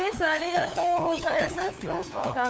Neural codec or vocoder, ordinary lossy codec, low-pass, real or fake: codec, 16 kHz, 8 kbps, FunCodec, trained on LibriTTS, 25 frames a second; none; none; fake